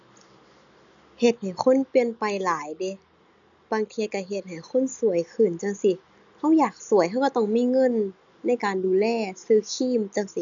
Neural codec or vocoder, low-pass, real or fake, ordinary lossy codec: none; 7.2 kHz; real; none